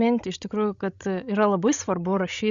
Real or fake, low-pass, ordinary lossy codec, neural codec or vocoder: fake; 7.2 kHz; Opus, 64 kbps; codec, 16 kHz, 16 kbps, FreqCodec, larger model